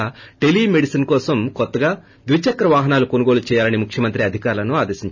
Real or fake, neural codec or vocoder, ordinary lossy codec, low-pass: real; none; none; 7.2 kHz